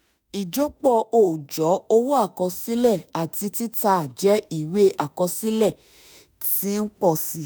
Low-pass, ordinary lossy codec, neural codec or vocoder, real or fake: none; none; autoencoder, 48 kHz, 32 numbers a frame, DAC-VAE, trained on Japanese speech; fake